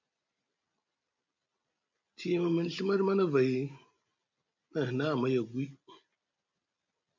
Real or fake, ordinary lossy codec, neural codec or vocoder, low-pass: real; MP3, 48 kbps; none; 7.2 kHz